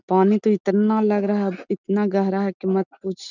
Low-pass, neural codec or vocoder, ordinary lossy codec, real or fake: 7.2 kHz; none; none; real